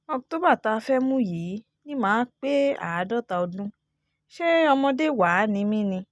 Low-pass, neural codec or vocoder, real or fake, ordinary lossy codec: none; none; real; none